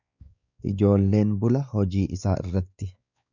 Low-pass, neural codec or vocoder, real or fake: 7.2 kHz; codec, 16 kHz, 4 kbps, X-Codec, WavLM features, trained on Multilingual LibriSpeech; fake